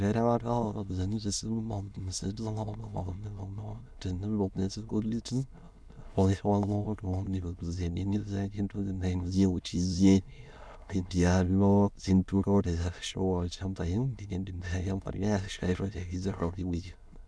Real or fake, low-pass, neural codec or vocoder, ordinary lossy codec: fake; none; autoencoder, 22.05 kHz, a latent of 192 numbers a frame, VITS, trained on many speakers; none